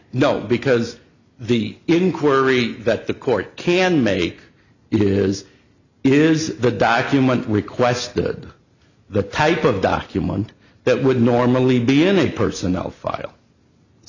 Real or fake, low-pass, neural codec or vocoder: real; 7.2 kHz; none